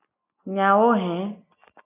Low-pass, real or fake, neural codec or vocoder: 3.6 kHz; real; none